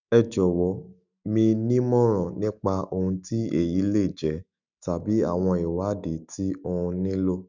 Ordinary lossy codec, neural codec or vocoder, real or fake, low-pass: none; autoencoder, 48 kHz, 128 numbers a frame, DAC-VAE, trained on Japanese speech; fake; 7.2 kHz